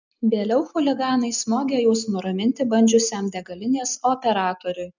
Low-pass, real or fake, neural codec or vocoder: 7.2 kHz; real; none